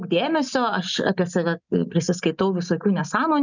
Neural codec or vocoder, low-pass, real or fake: none; 7.2 kHz; real